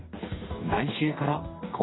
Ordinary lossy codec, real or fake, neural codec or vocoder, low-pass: AAC, 16 kbps; fake; codec, 44.1 kHz, 2.6 kbps, DAC; 7.2 kHz